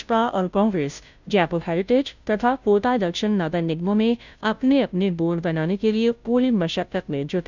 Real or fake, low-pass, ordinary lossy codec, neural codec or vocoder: fake; 7.2 kHz; none; codec, 16 kHz, 0.5 kbps, FunCodec, trained on Chinese and English, 25 frames a second